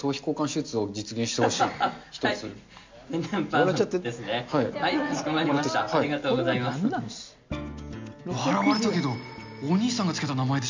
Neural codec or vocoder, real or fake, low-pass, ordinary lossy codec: none; real; 7.2 kHz; MP3, 64 kbps